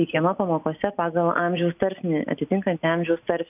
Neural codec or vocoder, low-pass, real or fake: none; 3.6 kHz; real